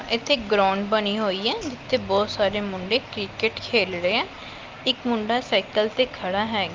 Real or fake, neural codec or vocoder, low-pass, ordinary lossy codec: real; none; 7.2 kHz; Opus, 32 kbps